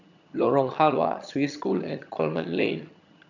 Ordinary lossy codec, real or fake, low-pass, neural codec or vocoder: none; fake; 7.2 kHz; vocoder, 22.05 kHz, 80 mel bands, HiFi-GAN